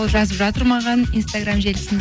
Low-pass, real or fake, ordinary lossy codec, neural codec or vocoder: none; real; none; none